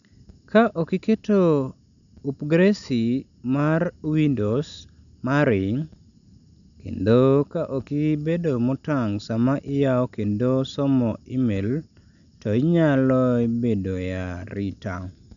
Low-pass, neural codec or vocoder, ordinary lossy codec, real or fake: 7.2 kHz; none; none; real